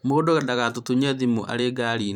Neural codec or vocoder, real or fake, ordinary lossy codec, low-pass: vocoder, 44.1 kHz, 128 mel bands every 512 samples, BigVGAN v2; fake; none; 19.8 kHz